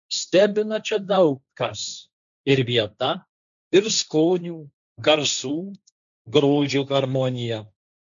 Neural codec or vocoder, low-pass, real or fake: codec, 16 kHz, 1.1 kbps, Voila-Tokenizer; 7.2 kHz; fake